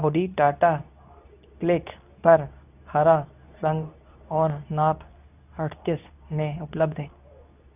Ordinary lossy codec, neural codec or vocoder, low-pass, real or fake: none; codec, 24 kHz, 0.9 kbps, WavTokenizer, medium speech release version 2; 3.6 kHz; fake